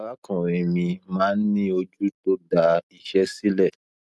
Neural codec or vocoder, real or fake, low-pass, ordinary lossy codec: none; real; none; none